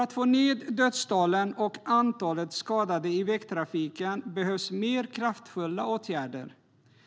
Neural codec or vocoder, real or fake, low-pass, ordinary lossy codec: none; real; none; none